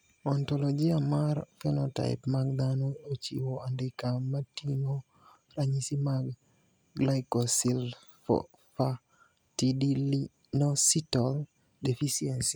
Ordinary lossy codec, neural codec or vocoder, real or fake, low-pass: none; none; real; none